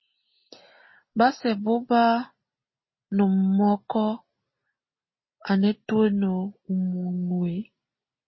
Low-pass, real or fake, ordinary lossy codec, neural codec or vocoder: 7.2 kHz; real; MP3, 24 kbps; none